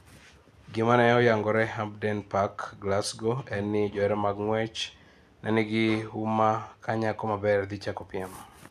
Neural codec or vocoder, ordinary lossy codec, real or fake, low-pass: none; none; real; 14.4 kHz